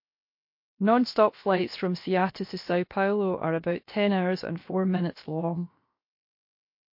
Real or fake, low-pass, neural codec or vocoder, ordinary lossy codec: fake; 5.4 kHz; codec, 16 kHz, 0.7 kbps, FocalCodec; MP3, 32 kbps